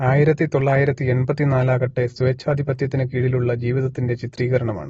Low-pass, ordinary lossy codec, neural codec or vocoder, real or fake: 19.8 kHz; AAC, 24 kbps; none; real